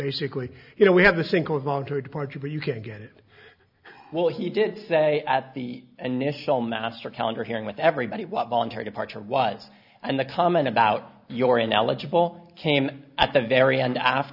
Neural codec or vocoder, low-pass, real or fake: none; 5.4 kHz; real